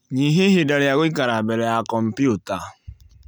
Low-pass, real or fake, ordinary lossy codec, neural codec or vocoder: none; real; none; none